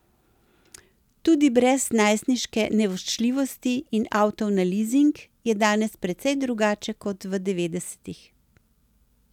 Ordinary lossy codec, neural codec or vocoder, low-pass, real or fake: none; none; 19.8 kHz; real